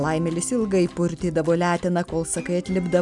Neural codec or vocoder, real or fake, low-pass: none; real; 10.8 kHz